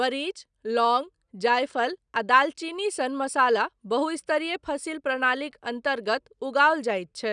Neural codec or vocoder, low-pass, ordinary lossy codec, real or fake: none; 9.9 kHz; none; real